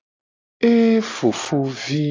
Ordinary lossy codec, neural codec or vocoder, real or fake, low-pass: AAC, 32 kbps; none; real; 7.2 kHz